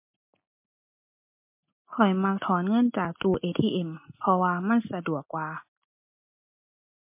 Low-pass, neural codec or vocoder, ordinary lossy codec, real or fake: 3.6 kHz; none; MP3, 24 kbps; real